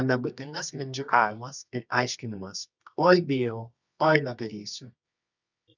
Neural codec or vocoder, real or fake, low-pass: codec, 24 kHz, 0.9 kbps, WavTokenizer, medium music audio release; fake; 7.2 kHz